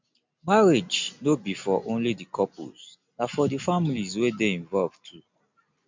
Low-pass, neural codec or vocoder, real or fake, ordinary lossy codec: 7.2 kHz; none; real; MP3, 64 kbps